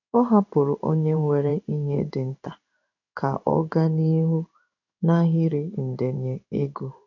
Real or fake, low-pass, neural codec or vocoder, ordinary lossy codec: fake; 7.2 kHz; codec, 16 kHz in and 24 kHz out, 1 kbps, XY-Tokenizer; none